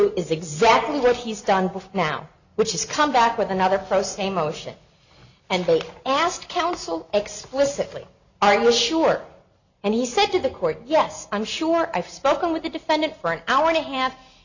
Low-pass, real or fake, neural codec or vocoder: 7.2 kHz; real; none